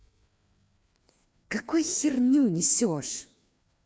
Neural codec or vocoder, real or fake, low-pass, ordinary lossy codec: codec, 16 kHz, 2 kbps, FreqCodec, larger model; fake; none; none